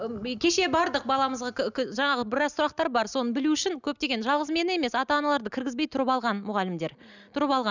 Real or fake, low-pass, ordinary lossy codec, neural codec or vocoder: real; 7.2 kHz; none; none